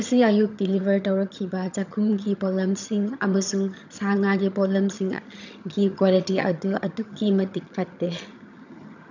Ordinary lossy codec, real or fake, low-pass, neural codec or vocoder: none; fake; 7.2 kHz; vocoder, 22.05 kHz, 80 mel bands, HiFi-GAN